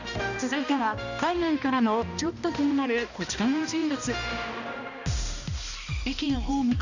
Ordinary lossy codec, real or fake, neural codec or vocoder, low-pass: none; fake; codec, 16 kHz, 1 kbps, X-Codec, HuBERT features, trained on balanced general audio; 7.2 kHz